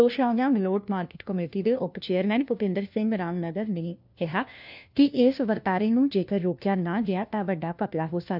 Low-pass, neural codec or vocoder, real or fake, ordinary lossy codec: 5.4 kHz; codec, 16 kHz, 1 kbps, FunCodec, trained on LibriTTS, 50 frames a second; fake; none